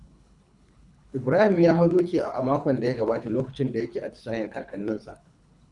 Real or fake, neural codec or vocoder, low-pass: fake; codec, 24 kHz, 3 kbps, HILCodec; 10.8 kHz